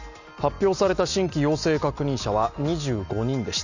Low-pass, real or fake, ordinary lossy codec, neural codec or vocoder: 7.2 kHz; real; none; none